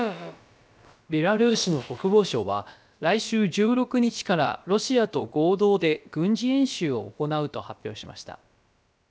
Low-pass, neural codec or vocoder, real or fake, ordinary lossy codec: none; codec, 16 kHz, about 1 kbps, DyCAST, with the encoder's durations; fake; none